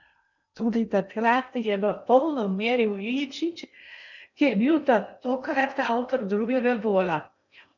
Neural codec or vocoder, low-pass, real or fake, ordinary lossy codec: codec, 16 kHz in and 24 kHz out, 0.8 kbps, FocalCodec, streaming, 65536 codes; 7.2 kHz; fake; none